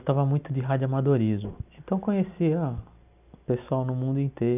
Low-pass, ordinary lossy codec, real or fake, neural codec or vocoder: 3.6 kHz; none; real; none